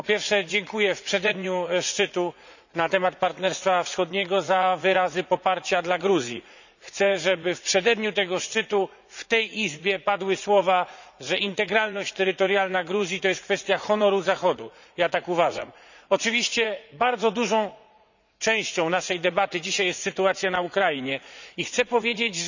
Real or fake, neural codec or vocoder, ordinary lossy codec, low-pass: fake; vocoder, 44.1 kHz, 80 mel bands, Vocos; none; 7.2 kHz